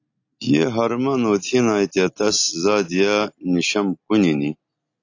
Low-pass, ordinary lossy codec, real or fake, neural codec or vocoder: 7.2 kHz; AAC, 48 kbps; real; none